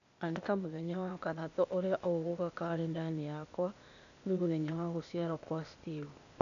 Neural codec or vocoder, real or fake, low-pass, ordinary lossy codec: codec, 16 kHz, 0.8 kbps, ZipCodec; fake; 7.2 kHz; none